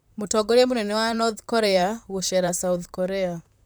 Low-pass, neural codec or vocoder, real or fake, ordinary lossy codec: none; vocoder, 44.1 kHz, 128 mel bands, Pupu-Vocoder; fake; none